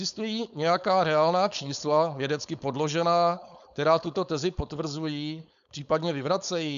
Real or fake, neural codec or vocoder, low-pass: fake; codec, 16 kHz, 4.8 kbps, FACodec; 7.2 kHz